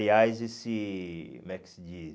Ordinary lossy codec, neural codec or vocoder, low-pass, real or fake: none; none; none; real